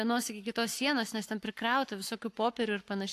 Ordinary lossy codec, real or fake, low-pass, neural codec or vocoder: AAC, 64 kbps; fake; 14.4 kHz; codec, 44.1 kHz, 7.8 kbps, Pupu-Codec